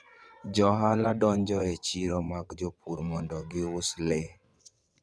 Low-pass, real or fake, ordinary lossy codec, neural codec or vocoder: none; fake; none; vocoder, 22.05 kHz, 80 mel bands, WaveNeXt